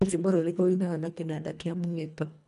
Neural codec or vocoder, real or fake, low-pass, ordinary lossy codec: codec, 24 kHz, 1.5 kbps, HILCodec; fake; 10.8 kHz; none